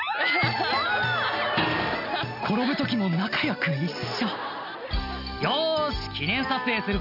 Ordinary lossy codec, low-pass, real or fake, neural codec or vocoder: none; 5.4 kHz; real; none